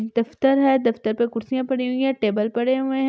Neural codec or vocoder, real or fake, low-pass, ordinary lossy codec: none; real; none; none